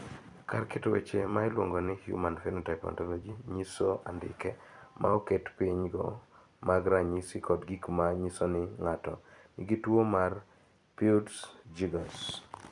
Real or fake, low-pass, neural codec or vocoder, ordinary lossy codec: fake; 10.8 kHz; vocoder, 44.1 kHz, 128 mel bands every 256 samples, BigVGAN v2; none